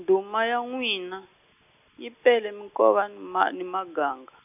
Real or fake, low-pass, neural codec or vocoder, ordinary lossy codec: real; 3.6 kHz; none; none